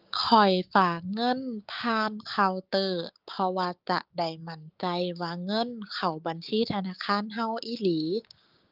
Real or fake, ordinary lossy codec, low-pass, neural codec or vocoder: fake; Opus, 24 kbps; 5.4 kHz; codec, 16 kHz, 6 kbps, DAC